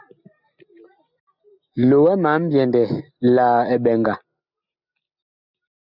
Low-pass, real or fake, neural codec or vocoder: 5.4 kHz; real; none